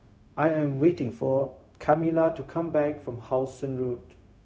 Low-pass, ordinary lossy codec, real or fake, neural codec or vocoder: none; none; fake; codec, 16 kHz, 0.4 kbps, LongCat-Audio-Codec